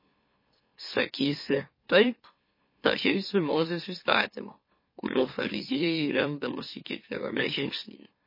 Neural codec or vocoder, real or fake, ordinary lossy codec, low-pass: autoencoder, 44.1 kHz, a latent of 192 numbers a frame, MeloTTS; fake; MP3, 24 kbps; 5.4 kHz